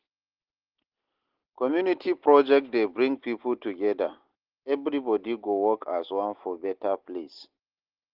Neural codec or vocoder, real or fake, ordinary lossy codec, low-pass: none; real; Opus, 16 kbps; 5.4 kHz